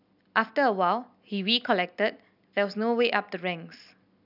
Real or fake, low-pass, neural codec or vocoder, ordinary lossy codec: real; 5.4 kHz; none; none